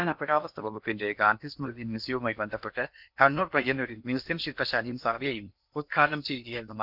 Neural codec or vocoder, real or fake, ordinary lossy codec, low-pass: codec, 16 kHz in and 24 kHz out, 0.8 kbps, FocalCodec, streaming, 65536 codes; fake; none; 5.4 kHz